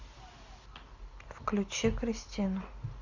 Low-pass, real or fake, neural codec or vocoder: 7.2 kHz; real; none